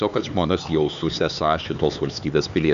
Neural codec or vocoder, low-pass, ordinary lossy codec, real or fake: codec, 16 kHz, 4 kbps, X-Codec, HuBERT features, trained on LibriSpeech; 7.2 kHz; Opus, 64 kbps; fake